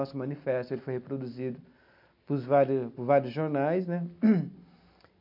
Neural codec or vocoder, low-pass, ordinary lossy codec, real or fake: none; 5.4 kHz; MP3, 48 kbps; real